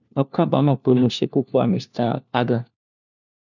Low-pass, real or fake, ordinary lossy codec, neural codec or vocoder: 7.2 kHz; fake; none; codec, 16 kHz, 1 kbps, FunCodec, trained on LibriTTS, 50 frames a second